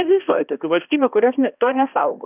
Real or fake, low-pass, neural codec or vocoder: fake; 3.6 kHz; codec, 16 kHz, 1 kbps, X-Codec, HuBERT features, trained on balanced general audio